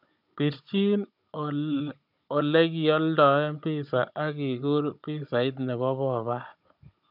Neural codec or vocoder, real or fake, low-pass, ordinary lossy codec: codec, 16 kHz, 16 kbps, FunCodec, trained on Chinese and English, 50 frames a second; fake; 5.4 kHz; none